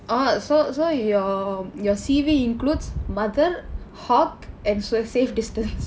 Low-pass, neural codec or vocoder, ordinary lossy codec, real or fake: none; none; none; real